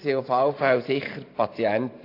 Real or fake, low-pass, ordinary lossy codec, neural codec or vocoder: fake; 5.4 kHz; AAC, 32 kbps; vocoder, 44.1 kHz, 128 mel bands every 512 samples, BigVGAN v2